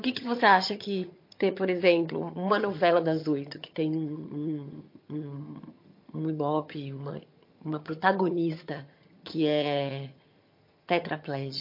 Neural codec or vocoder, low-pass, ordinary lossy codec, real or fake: vocoder, 22.05 kHz, 80 mel bands, HiFi-GAN; 5.4 kHz; MP3, 32 kbps; fake